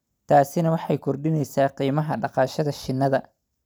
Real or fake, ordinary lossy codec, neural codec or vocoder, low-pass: real; none; none; none